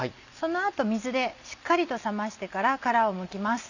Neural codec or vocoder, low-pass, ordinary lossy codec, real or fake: none; 7.2 kHz; none; real